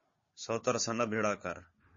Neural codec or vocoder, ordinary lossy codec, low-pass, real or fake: codec, 16 kHz, 4 kbps, FunCodec, trained on Chinese and English, 50 frames a second; MP3, 32 kbps; 7.2 kHz; fake